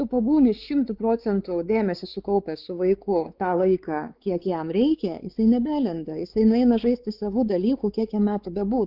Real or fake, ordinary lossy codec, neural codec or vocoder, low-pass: fake; Opus, 32 kbps; codec, 16 kHz, 4 kbps, X-Codec, WavLM features, trained on Multilingual LibriSpeech; 5.4 kHz